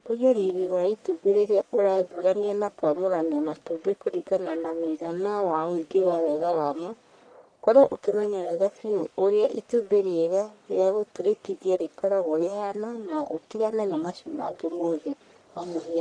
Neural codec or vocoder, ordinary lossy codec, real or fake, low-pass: codec, 44.1 kHz, 1.7 kbps, Pupu-Codec; none; fake; 9.9 kHz